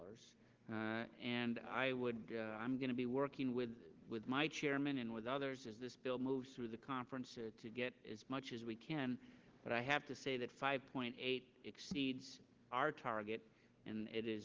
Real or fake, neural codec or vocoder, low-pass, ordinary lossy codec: real; none; 7.2 kHz; Opus, 16 kbps